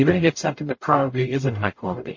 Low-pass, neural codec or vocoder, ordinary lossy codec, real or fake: 7.2 kHz; codec, 44.1 kHz, 0.9 kbps, DAC; MP3, 32 kbps; fake